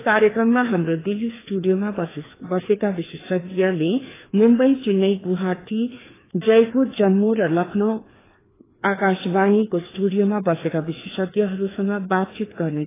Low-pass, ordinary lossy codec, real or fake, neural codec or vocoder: 3.6 kHz; AAC, 16 kbps; fake; codec, 16 kHz, 2 kbps, FreqCodec, larger model